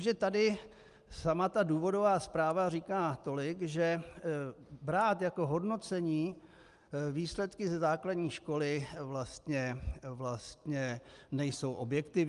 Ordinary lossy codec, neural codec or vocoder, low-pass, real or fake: Opus, 32 kbps; none; 10.8 kHz; real